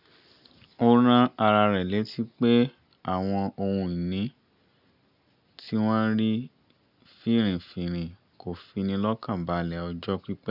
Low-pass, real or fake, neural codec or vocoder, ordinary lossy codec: 5.4 kHz; real; none; none